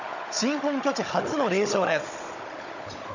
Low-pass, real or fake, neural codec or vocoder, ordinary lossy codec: 7.2 kHz; fake; codec, 16 kHz, 16 kbps, FunCodec, trained on Chinese and English, 50 frames a second; none